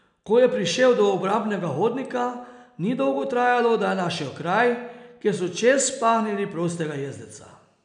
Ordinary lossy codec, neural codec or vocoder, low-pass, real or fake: none; none; 9.9 kHz; real